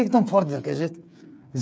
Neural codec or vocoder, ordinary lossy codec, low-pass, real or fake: codec, 16 kHz, 8 kbps, FreqCodec, smaller model; none; none; fake